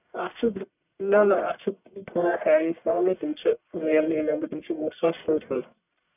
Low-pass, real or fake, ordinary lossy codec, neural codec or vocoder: 3.6 kHz; fake; none; codec, 44.1 kHz, 1.7 kbps, Pupu-Codec